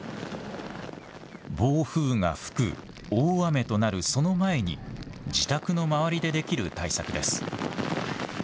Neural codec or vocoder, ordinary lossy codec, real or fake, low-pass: none; none; real; none